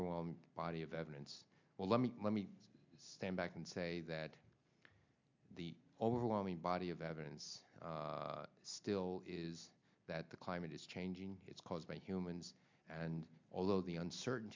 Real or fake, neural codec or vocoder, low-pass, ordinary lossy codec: real; none; 7.2 kHz; MP3, 64 kbps